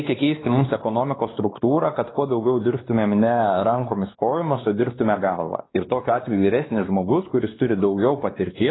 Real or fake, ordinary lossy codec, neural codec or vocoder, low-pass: fake; AAC, 16 kbps; codec, 16 kHz, 2 kbps, X-Codec, WavLM features, trained on Multilingual LibriSpeech; 7.2 kHz